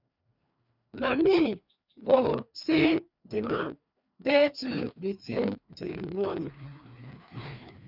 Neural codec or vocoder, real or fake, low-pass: codec, 16 kHz, 2 kbps, FreqCodec, larger model; fake; 5.4 kHz